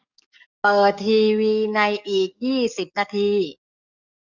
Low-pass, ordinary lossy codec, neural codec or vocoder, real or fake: 7.2 kHz; none; codec, 44.1 kHz, 7.8 kbps, DAC; fake